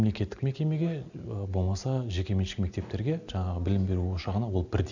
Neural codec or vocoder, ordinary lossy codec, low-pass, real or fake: none; none; 7.2 kHz; real